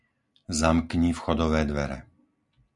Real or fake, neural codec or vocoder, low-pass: real; none; 10.8 kHz